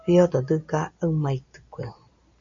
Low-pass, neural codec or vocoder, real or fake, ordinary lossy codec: 7.2 kHz; none; real; MP3, 48 kbps